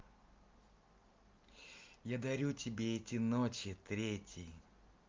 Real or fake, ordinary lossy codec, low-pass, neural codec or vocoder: real; Opus, 24 kbps; 7.2 kHz; none